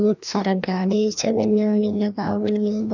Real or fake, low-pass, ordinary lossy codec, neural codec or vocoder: fake; 7.2 kHz; none; codec, 16 kHz, 1 kbps, FreqCodec, larger model